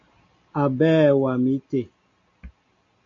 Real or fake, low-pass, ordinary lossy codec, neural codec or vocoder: real; 7.2 kHz; MP3, 48 kbps; none